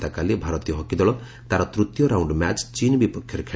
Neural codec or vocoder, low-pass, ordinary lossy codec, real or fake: none; none; none; real